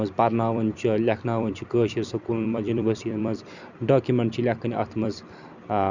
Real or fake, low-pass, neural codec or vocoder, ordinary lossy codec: fake; 7.2 kHz; vocoder, 44.1 kHz, 128 mel bands every 256 samples, BigVGAN v2; Opus, 64 kbps